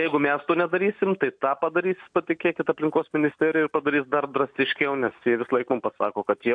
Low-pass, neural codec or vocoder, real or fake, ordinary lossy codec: 9.9 kHz; none; real; AAC, 64 kbps